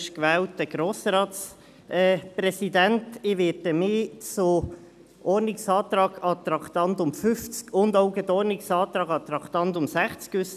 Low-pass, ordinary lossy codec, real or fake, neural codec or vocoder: 14.4 kHz; none; real; none